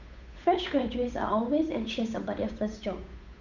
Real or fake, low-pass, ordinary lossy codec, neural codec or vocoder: fake; 7.2 kHz; none; codec, 16 kHz, 8 kbps, FunCodec, trained on Chinese and English, 25 frames a second